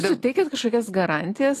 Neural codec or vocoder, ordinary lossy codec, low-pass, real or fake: none; AAC, 48 kbps; 14.4 kHz; real